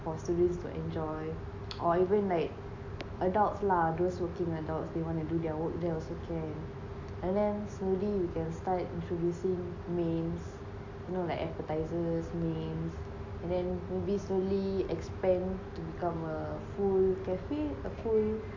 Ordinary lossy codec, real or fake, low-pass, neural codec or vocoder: AAC, 48 kbps; real; 7.2 kHz; none